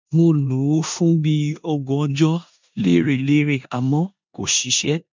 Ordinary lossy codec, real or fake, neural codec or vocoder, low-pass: MP3, 64 kbps; fake; codec, 16 kHz in and 24 kHz out, 0.9 kbps, LongCat-Audio-Codec, four codebook decoder; 7.2 kHz